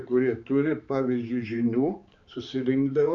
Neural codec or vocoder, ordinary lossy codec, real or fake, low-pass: codec, 16 kHz, 4 kbps, X-Codec, HuBERT features, trained on balanced general audio; Opus, 64 kbps; fake; 7.2 kHz